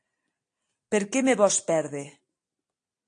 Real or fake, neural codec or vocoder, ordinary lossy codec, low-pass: real; none; AAC, 48 kbps; 9.9 kHz